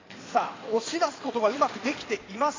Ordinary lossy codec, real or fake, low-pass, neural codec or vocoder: AAC, 32 kbps; fake; 7.2 kHz; codec, 16 kHz in and 24 kHz out, 2.2 kbps, FireRedTTS-2 codec